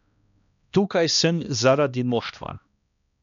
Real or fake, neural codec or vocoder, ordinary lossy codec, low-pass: fake; codec, 16 kHz, 1 kbps, X-Codec, HuBERT features, trained on balanced general audio; none; 7.2 kHz